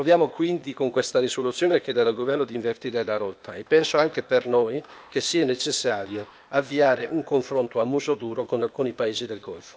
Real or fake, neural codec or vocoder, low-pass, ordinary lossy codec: fake; codec, 16 kHz, 0.8 kbps, ZipCodec; none; none